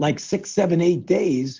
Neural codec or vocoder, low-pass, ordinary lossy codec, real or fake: none; 7.2 kHz; Opus, 16 kbps; real